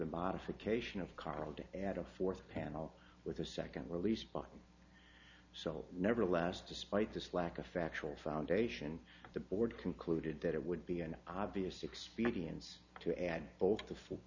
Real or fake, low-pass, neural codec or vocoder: real; 7.2 kHz; none